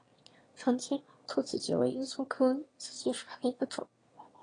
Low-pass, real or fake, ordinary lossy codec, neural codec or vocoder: 9.9 kHz; fake; AAC, 32 kbps; autoencoder, 22.05 kHz, a latent of 192 numbers a frame, VITS, trained on one speaker